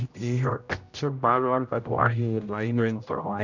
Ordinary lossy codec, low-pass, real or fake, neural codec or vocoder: none; 7.2 kHz; fake; codec, 16 kHz, 0.5 kbps, X-Codec, HuBERT features, trained on general audio